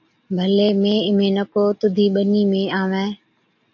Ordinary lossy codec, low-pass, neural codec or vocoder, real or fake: AAC, 48 kbps; 7.2 kHz; none; real